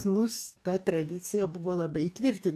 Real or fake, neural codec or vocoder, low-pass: fake; codec, 44.1 kHz, 2.6 kbps, DAC; 14.4 kHz